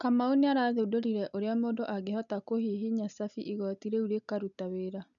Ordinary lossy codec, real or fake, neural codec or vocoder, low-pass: none; real; none; 7.2 kHz